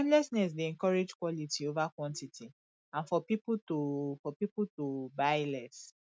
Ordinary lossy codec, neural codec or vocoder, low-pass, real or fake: none; none; none; real